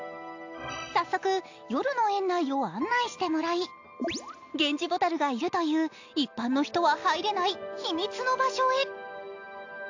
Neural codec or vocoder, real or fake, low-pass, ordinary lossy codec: none; real; 7.2 kHz; AAC, 48 kbps